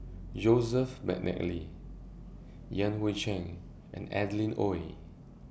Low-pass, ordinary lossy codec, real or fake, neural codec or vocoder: none; none; real; none